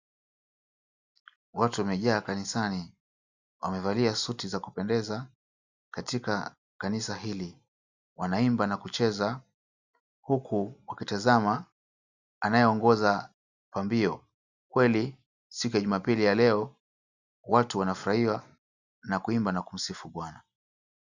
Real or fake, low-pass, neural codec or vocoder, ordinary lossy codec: real; 7.2 kHz; none; Opus, 64 kbps